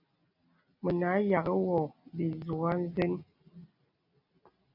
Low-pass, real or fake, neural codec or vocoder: 5.4 kHz; real; none